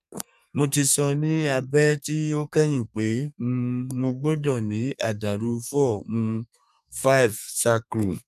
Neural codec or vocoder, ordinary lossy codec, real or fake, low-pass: codec, 32 kHz, 1.9 kbps, SNAC; none; fake; 14.4 kHz